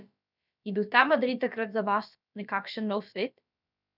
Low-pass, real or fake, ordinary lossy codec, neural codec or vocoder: 5.4 kHz; fake; none; codec, 16 kHz, about 1 kbps, DyCAST, with the encoder's durations